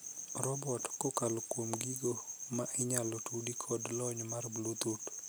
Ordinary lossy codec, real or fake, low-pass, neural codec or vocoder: none; real; none; none